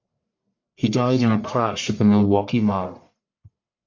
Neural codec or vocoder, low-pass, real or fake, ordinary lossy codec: codec, 44.1 kHz, 1.7 kbps, Pupu-Codec; 7.2 kHz; fake; MP3, 48 kbps